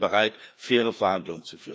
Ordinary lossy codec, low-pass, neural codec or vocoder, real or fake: none; none; codec, 16 kHz, 2 kbps, FreqCodec, larger model; fake